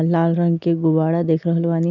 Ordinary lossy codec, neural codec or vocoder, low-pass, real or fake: none; none; 7.2 kHz; real